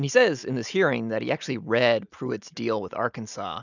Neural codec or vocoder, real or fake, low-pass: none; real; 7.2 kHz